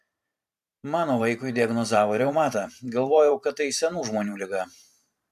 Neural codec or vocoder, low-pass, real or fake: vocoder, 44.1 kHz, 128 mel bands every 512 samples, BigVGAN v2; 14.4 kHz; fake